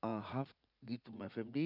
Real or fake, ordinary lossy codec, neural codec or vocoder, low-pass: fake; none; vocoder, 44.1 kHz, 80 mel bands, Vocos; 5.4 kHz